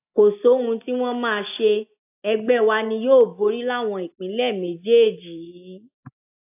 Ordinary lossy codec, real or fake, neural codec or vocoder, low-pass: AAC, 32 kbps; real; none; 3.6 kHz